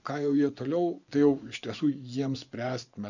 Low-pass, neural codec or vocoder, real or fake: 7.2 kHz; vocoder, 24 kHz, 100 mel bands, Vocos; fake